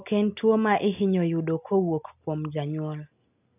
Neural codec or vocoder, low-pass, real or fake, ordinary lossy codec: none; 3.6 kHz; real; AAC, 32 kbps